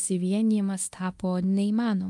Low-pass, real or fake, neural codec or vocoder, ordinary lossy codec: 10.8 kHz; fake; codec, 24 kHz, 0.9 kbps, DualCodec; Opus, 32 kbps